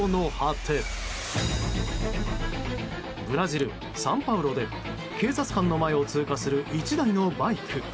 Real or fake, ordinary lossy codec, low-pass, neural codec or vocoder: real; none; none; none